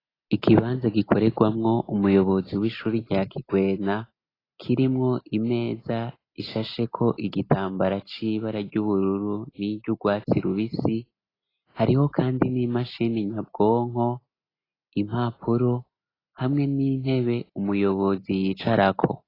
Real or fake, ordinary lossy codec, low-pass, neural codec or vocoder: real; AAC, 24 kbps; 5.4 kHz; none